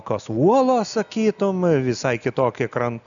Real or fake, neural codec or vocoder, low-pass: real; none; 7.2 kHz